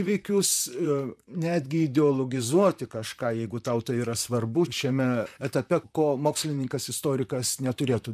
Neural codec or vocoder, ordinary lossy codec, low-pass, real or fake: vocoder, 44.1 kHz, 128 mel bands, Pupu-Vocoder; MP3, 96 kbps; 14.4 kHz; fake